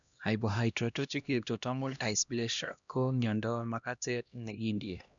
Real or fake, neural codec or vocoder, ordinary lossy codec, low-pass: fake; codec, 16 kHz, 1 kbps, X-Codec, HuBERT features, trained on LibriSpeech; none; 7.2 kHz